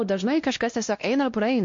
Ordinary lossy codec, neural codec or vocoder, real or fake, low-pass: MP3, 48 kbps; codec, 16 kHz, 1 kbps, X-Codec, WavLM features, trained on Multilingual LibriSpeech; fake; 7.2 kHz